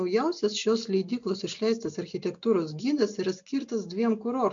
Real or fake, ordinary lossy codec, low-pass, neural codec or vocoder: real; MP3, 64 kbps; 7.2 kHz; none